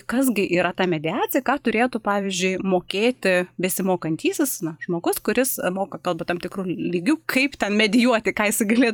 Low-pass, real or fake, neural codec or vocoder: 19.8 kHz; fake; vocoder, 44.1 kHz, 128 mel bands every 512 samples, BigVGAN v2